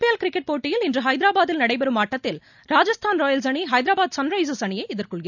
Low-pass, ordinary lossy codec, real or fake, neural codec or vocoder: 7.2 kHz; none; real; none